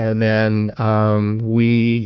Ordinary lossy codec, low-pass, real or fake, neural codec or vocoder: Opus, 64 kbps; 7.2 kHz; fake; codec, 16 kHz, 1 kbps, FunCodec, trained on Chinese and English, 50 frames a second